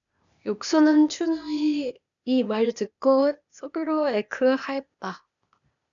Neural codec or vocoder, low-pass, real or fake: codec, 16 kHz, 0.8 kbps, ZipCodec; 7.2 kHz; fake